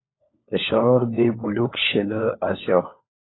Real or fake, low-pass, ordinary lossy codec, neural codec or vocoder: fake; 7.2 kHz; AAC, 16 kbps; codec, 16 kHz, 4 kbps, FunCodec, trained on LibriTTS, 50 frames a second